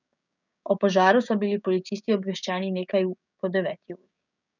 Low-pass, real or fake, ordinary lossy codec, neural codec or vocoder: 7.2 kHz; fake; none; codec, 16 kHz, 6 kbps, DAC